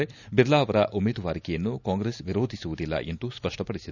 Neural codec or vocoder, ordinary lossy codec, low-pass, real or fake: none; none; 7.2 kHz; real